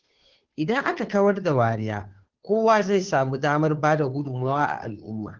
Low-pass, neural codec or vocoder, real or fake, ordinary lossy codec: 7.2 kHz; codec, 16 kHz, 2 kbps, FunCodec, trained on Chinese and English, 25 frames a second; fake; Opus, 16 kbps